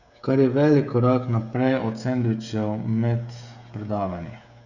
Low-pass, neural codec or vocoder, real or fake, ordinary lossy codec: 7.2 kHz; codec, 16 kHz, 16 kbps, FreqCodec, smaller model; fake; Opus, 64 kbps